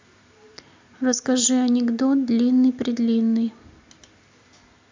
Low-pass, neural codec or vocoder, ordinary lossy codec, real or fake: 7.2 kHz; none; MP3, 64 kbps; real